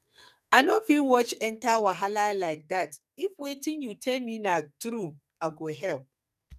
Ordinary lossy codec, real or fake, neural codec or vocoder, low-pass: none; fake; codec, 44.1 kHz, 2.6 kbps, SNAC; 14.4 kHz